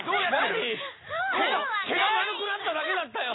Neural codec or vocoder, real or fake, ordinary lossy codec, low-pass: none; real; AAC, 16 kbps; 7.2 kHz